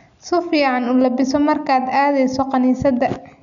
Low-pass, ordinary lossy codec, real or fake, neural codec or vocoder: 7.2 kHz; none; real; none